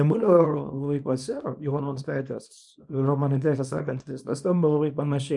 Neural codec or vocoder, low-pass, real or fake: codec, 24 kHz, 0.9 kbps, WavTokenizer, small release; 10.8 kHz; fake